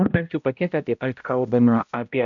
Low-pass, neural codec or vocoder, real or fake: 7.2 kHz; codec, 16 kHz, 0.5 kbps, X-Codec, HuBERT features, trained on balanced general audio; fake